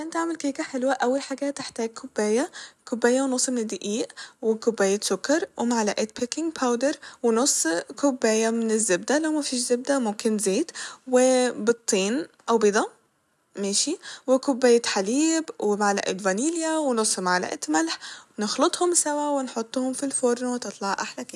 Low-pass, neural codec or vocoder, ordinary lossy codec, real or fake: none; none; none; real